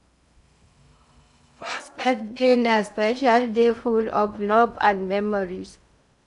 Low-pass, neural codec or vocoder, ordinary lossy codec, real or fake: 10.8 kHz; codec, 16 kHz in and 24 kHz out, 0.6 kbps, FocalCodec, streaming, 2048 codes; none; fake